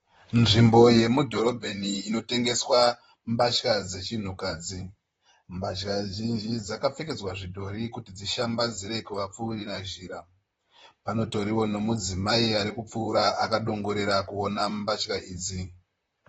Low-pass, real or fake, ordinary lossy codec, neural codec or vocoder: 19.8 kHz; fake; AAC, 24 kbps; vocoder, 44.1 kHz, 128 mel bands, Pupu-Vocoder